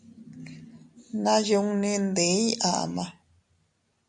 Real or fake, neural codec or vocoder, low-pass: real; none; 10.8 kHz